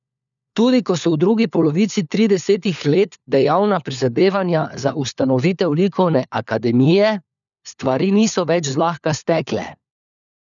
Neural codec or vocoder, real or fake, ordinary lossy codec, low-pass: codec, 16 kHz, 4 kbps, FunCodec, trained on LibriTTS, 50 frames a second; fake; none; 7.2 kHz